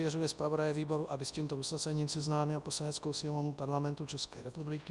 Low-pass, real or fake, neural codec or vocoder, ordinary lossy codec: 10.8 kHz; fake; codec, 24 kHz, 0.9 kbps, WavTokenizer, large speech release; Opus, 64 kbps